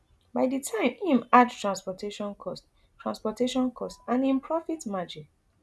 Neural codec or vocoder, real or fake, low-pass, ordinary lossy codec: none; real; none; none